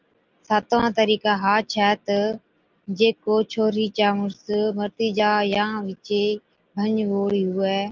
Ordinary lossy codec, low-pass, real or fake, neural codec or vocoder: Opus, 32 kbps; 7.2 kHz; real; none